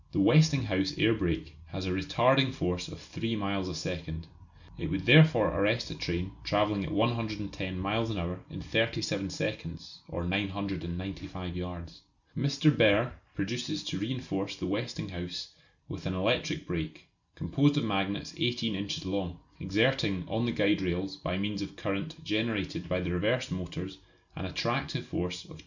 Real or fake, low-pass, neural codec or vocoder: real; 7.2 kHz; none